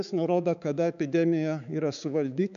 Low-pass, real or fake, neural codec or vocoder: 7.2 kHz; fake; codec, 16 kHz, 4 kbps, X-Codec, HuBERT features, trained on balanced general audio